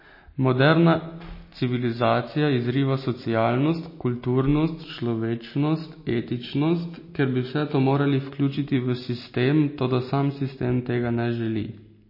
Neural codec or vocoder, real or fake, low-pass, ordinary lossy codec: none; real; 5.4 kHz; MP3, 24 kbps